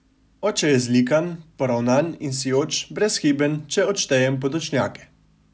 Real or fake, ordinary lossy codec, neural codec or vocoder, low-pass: real; none; none; none